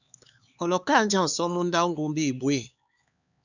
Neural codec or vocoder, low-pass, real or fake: codec, 16 kHz, 4 kbps, X-Codec, HuBERT features, trained on LibriSpeech; 7.2 kHz; fake